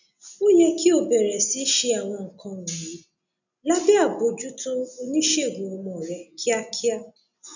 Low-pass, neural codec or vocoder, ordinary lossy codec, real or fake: 7.2 kHz; none; none; real